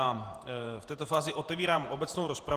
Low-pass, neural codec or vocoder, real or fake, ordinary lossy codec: 14.4 kHz; vocoder, 48 kHz, 128 mel bands, Vocos; fake; Opus, 24 kbps